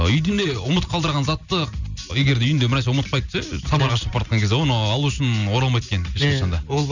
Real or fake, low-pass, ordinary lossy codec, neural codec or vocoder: real; 7.2 kHz; none; none